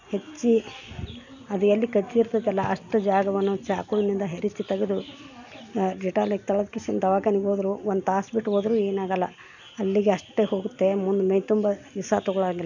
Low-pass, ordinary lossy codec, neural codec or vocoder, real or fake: 7.2 kHz; none; none; real